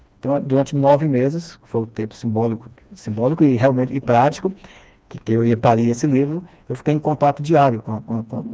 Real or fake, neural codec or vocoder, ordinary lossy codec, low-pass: fake; codec, 16 kHz, 2 kbps, FreqCodec, smaller model; none; none